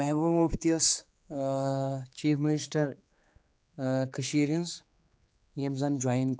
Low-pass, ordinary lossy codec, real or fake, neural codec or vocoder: none; none; fake; codec, 16 kHz, 4 kbps, X-Codec, HuBERT features, trained on general audio